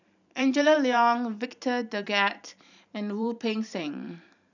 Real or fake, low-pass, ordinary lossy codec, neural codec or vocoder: fake; 7.2 kHz; none; vocoder, 44.1 kHz, 128 mel bands every 512 samples, BigVGAN v2